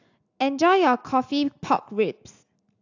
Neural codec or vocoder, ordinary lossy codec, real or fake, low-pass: none; AAC, 48 kbps; real; 7.2 kHz